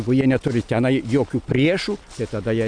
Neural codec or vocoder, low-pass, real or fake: none; 9.9 kHz; real